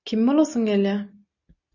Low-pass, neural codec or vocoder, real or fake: 7.2 kHz; none; real